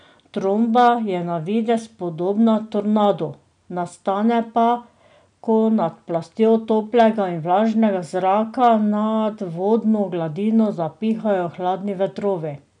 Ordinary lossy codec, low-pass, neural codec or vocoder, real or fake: none; 9.9 kHz; none; real